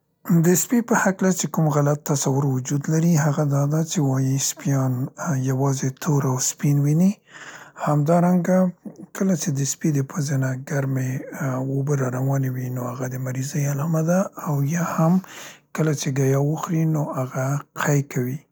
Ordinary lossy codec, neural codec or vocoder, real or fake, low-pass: none; none; real; none